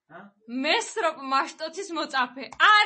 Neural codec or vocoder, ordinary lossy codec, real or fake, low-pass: none; MP3, 32 kbps; real; 10.8 kHz